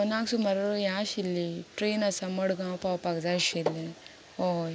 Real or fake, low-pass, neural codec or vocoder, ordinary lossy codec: real; none; none; none